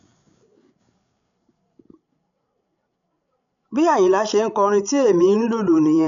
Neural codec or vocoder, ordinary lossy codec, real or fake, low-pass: codec, 16 kHz, 16 kbps, FreqCodec, larger model; none; fake; 7.2 kHz